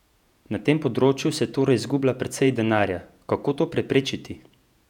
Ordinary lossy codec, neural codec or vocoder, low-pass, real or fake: none; vocoder, 48 kHz, 128 mel bands, Vocos; 19.8 kHz; fake